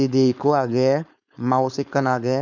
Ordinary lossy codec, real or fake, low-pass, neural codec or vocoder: none; fake; 7.2 kHz; codec, 16 kHz, 4.8 kbps, FACodec